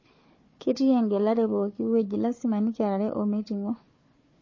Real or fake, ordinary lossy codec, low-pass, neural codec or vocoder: fake; MP3, 32 kbps; 7.2 kHz; codec, 16 kHz, 4 kbps, FunCodec, trained on Chinese and English, 50 frames a second